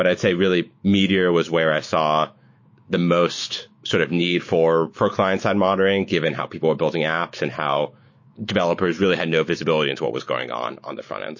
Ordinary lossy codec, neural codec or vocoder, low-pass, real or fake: MP3, 32 kbps; autoencoder, 48 kHz, 128 numbers a frame, DAC-VAE, trained on Japanese speech; 7.2 kHz; fake